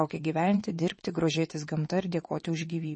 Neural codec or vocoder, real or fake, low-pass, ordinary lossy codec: vocoder, 22.05 kHz, 80 mel bands, WaveNeXt; fake; 9.9 kHz; MP3, 32 kbps